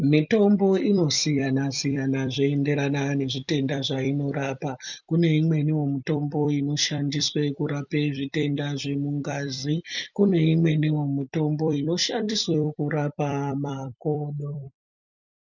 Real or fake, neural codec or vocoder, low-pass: fake; vocoder, 44.1 kHz, 128 mel bands every 256 samples, BigVGAN v2; 7.2 kHz